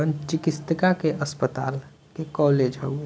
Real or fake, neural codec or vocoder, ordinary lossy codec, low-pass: real; none; none; none